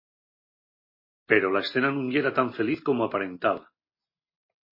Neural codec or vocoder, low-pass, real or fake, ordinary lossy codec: none; 5.4 kHz; real; MP3, 24 kbps